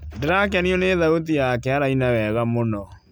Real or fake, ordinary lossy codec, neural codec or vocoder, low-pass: real; none; none; none